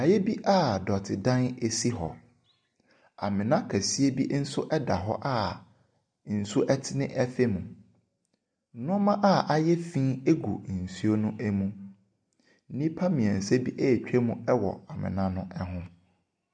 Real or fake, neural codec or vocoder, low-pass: real; none; 9.9 kHz